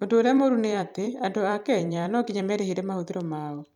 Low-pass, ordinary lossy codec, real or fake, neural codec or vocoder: 19.8 kHz; none; fake; vocoder, 44.1 kHz, 128 mel bands every 256 samples, BigVGAN v2